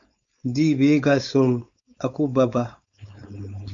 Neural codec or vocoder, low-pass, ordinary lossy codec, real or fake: codec, 16 kHz, 4.8 kbps, FACodec; 7.2 kHz; AAC, 64 kbps; fake